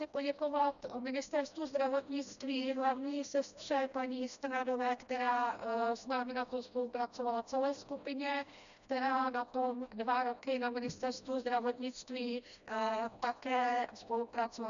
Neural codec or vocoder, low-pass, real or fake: codec, 16 kHz, 1 kbps, FreqCodec, smaller model; 7.2 kHz; fake